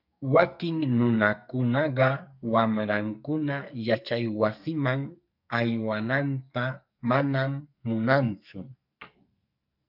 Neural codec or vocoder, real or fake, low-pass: codec, 44.1 kHz, 2.6 kbps, SNAC; fake; 5.4 kHz